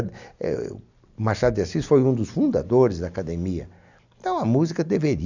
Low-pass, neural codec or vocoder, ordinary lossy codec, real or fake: 7.2 kHz; none; none; real